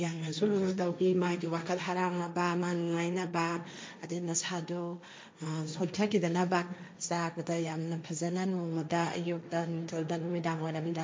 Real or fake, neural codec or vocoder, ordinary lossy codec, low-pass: fake; codec, 16 kHz, 1.1 kbps, Voila-Tokenizer; none; none